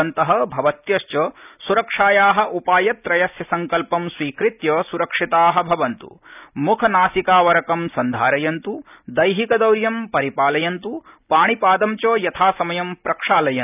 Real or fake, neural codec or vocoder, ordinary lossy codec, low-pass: real; none; none; 3.6 kHz